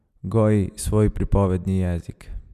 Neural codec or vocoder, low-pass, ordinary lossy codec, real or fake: none; 14.4 kHz; MP3, 96 kbps; real